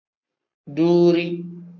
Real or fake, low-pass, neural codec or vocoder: fake; 7.2 kHz; codec, 44.1 kHz, 7.8 kbps, Pupu-Codec